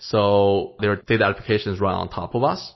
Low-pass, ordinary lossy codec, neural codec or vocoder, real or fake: 7.2 kHz; MP3, 24 kbps; none; real